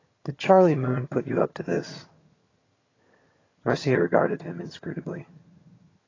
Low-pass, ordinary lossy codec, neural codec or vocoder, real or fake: 7.2 kHz; AAC, 32 kbps; vocoder, 22.05 kHz, 80 mel bands, HiFi-GAN; fake